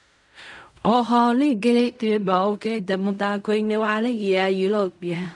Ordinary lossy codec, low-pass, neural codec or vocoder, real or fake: none; 10.8 kHz; codec, 16 kHz in and 24 kHz out, 0.4 kbps, LongCat-Audio-Codec, fine tuned four codebook decoder; fake